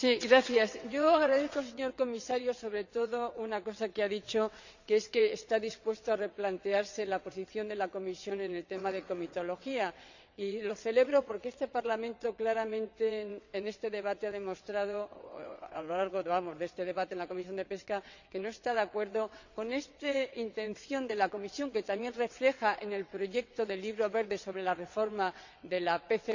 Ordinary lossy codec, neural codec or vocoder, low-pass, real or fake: none; vocoder, 22.05 kHz, 80 mel bands, WaveNeXt; 7.2 kHz; fake